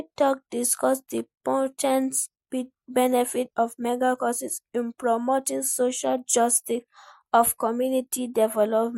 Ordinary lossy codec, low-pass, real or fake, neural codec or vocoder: MP3, 64 kbps; 19.8 kHz; real; none